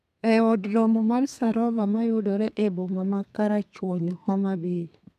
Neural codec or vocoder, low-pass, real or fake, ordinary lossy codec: codec, 32 kHz, 1.9 kbps, SNAC; 14.4 kHz; fake; none